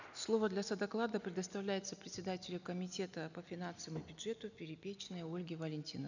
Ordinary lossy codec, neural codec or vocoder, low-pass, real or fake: none; none; 7.2 kHz; real